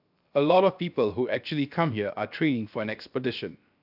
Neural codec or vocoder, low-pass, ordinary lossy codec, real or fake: codec, 16 kHz, 0.7 kbps, FocalCodec; 5.4 kHz; AAC, 48 kbps; fake